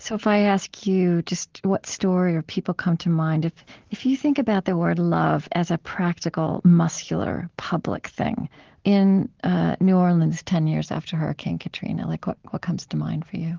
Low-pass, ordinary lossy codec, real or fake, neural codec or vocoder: 7.2 kHz; Opus, 16 kbps; real; none